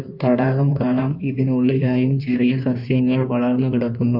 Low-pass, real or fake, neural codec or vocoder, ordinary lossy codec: 5.4 kHz; fake; codec, 16 kHz in and 24 kHz out, 1.1 kbps, FireRedTTS-2 codec; none